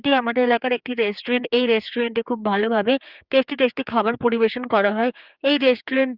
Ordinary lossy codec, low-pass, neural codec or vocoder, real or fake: Opus, 24 kbps; 5.4 kHz; codec, 16 kHz, 4 kbps, X-Codec, HuBERT features, trained on general audio; fake